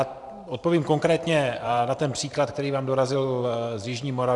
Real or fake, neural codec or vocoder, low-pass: fake; vocoder, 44.1 kHz, 128 mel bands every 512 samples, BigVGAN v2; 10.8 kHz